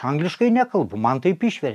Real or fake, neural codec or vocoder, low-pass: real; none; 14.4 kHz